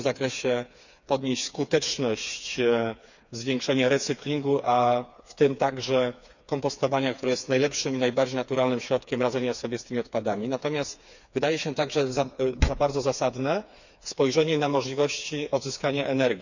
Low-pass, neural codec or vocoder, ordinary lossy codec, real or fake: 7.2 kHz; codec, 16 kHz, 4 kbps, FreqCodec, smaller model; none; fake